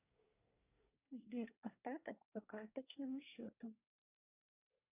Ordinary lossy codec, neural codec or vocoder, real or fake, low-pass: AAC, 32 kbps; codec, 24 kHz, 1 kbps, SNAC; fake; 3.6 kHz